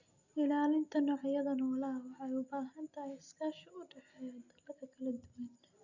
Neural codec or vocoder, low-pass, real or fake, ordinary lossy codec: none; 7.2 kHz; real; Opus, 64 kbps